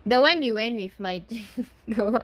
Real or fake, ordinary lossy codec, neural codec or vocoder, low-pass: fake; Opus, 32 kbps; codec, 32 kHz, 1.9 kbps, SNAC; 14.4 kHz